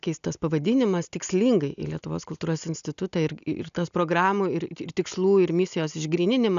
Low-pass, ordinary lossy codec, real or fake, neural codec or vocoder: 7.2 kHz; MP3, 96 kbps; real; none